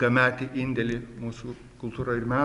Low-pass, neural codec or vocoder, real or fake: 10.8 kHz; none; real